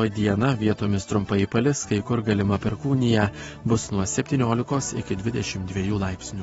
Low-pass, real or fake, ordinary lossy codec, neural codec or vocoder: 19.8 kHz; fake; AAC, 24 kbps; vocoder, 44.1 kHz, 128 mel bands every 512 samples, BigVGAN v2